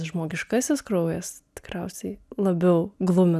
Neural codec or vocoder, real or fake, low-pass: none; real; 14.4 kHz